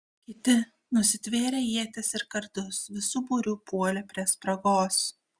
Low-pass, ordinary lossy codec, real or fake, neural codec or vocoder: 9.9 kHz; Opus, 64 kbps; real; none